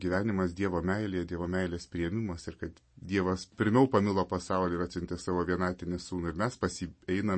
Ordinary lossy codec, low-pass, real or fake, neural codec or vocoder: MP3, 32 kbps; 9.9 kHz; real; none